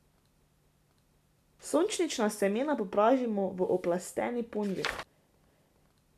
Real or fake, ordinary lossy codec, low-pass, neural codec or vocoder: real; MP3, 96 kbps; 14.4 kHz; none